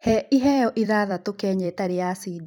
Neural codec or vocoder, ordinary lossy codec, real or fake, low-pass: vocoder, 44.1 kHz, 128 mel bands every 256 samples, BigVGAN v2; none; fake; 19.8 kHz